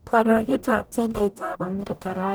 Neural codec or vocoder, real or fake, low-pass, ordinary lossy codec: codec, 44.1 kHz, 0.9 kbps, DAC; fake; none; none